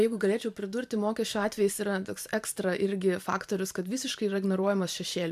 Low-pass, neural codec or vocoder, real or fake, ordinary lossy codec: 14.4 kHz; vocoder, 44.1 kHz, 128 mel bands every 512 samples, BigVGAN v2; fake; AAC, 96 kbps